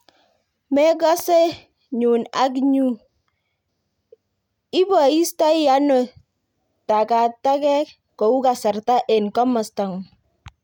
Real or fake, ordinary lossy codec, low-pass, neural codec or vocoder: real; none; 19.8 kHz; none